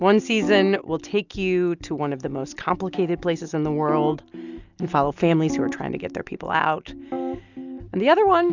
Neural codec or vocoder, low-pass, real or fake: none; 7.2 kHz; real